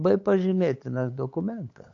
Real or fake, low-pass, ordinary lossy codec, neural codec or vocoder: fake; 7.2 kHz; AAC, 48 kbps; codec, 16 kHz, 8 kbps, FunCodec, trained on LibriTTS, 25 frames a second